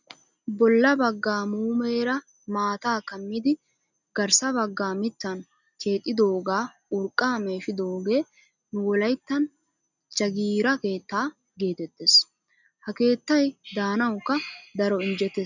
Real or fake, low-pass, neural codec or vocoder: real; 7.2 kHz; none